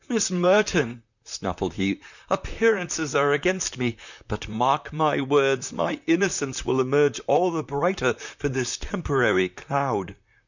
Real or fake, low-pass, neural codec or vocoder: fake; 7.2 kHz; vocoder, 44.1 kHz, 128 mel bands, Pupu-Vocoder